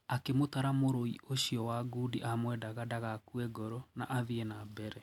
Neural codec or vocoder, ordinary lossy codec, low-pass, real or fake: none; MP3, 96 kbps; 19.8 kHz; real